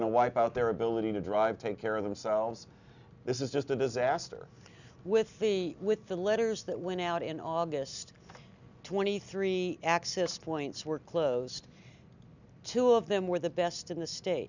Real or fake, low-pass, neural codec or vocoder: real; 7.2 kHz; none